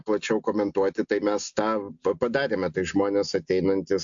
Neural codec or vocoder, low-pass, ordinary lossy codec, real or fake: none; 7.2 kHz; AAC, 64 kbps; real